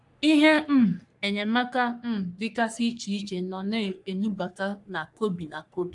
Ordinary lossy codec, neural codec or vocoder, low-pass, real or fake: AAC, 64 kbps; codec, 44.1 kHz, 3.4 kbps, Pupu-Codec; 10.8 kHz; fake